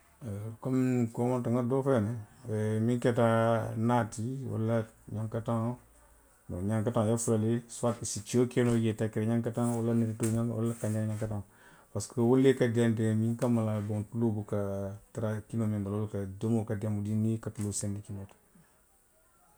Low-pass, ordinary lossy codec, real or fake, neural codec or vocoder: none; none; real; none